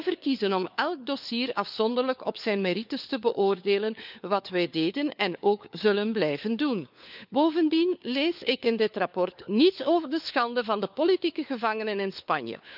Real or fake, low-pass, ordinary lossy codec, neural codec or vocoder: fake; 5.4 kHz; none; codec, 16 kHz, 4 kbps, FunCodec, trained on LibriTTS, 50 frames a second